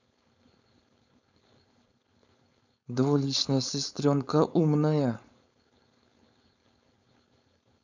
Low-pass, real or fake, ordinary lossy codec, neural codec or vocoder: 7.2 kHz; fake; none; codec, 16 kHz, 4.8 kbps, FACodec